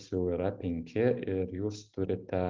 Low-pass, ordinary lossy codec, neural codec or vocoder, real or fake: 7.2 kHz; Opus, 24 kbps; none; real